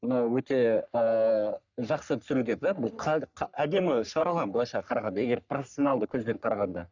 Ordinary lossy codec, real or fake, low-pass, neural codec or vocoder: none; fake; 7.2 kHz; codec, 44.1 kHz, 3.4 kbps, Pupu-Codec